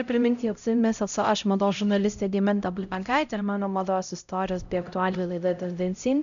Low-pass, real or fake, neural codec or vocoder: 7.2 kHz; fake; codec, 16 kHz, 0.5 kbps, X-Codec, HuBERT features, trained on LibriSpeech